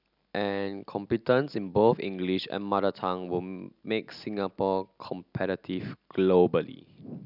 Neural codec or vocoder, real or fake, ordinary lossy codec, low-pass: none; real; none; 5.4 kHz